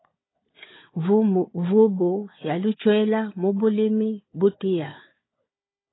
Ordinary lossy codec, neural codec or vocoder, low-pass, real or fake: AAC, 16 kbps; codec, 16 kHz, 4 kbps, FunCodec, trained on Chinese and English, 50 frames a second; 7.2 kHz; fake